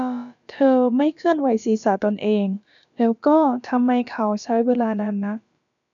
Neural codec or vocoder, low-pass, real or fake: codec, 16 kHz, about 1 kbps, DyCAST, with the encoder's durations; 7.2 kHz; fake